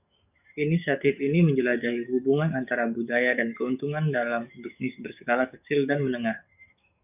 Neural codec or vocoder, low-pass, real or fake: codec, 44.1 kHz, 7.8 kbps, DAC; 3.6 kHz; fake